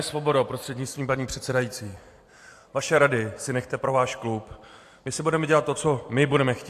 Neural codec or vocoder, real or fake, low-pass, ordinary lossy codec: vocoder, 44.1 kHz, 128 mel bands every 256 samples, BigVGAN v2; fake; 14.4 kHz; AAC, 64 kbps